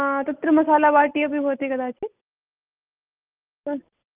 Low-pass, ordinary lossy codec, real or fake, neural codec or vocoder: 3.6 kHz; Opus, 32 kbps; real; none